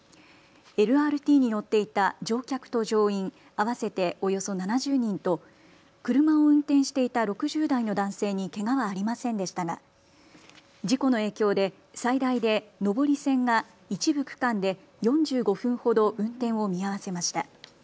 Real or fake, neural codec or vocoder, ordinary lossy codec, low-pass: real; none; none; none